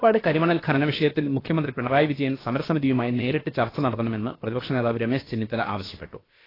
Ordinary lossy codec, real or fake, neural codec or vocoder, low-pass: AAC, 24 kbps; fake; codec, 16 kHz, about 1 kbps, DyCAST, with the encoder's durations; 5.4 kHz